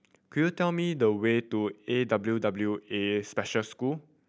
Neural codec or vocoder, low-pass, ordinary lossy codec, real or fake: none; none; none; real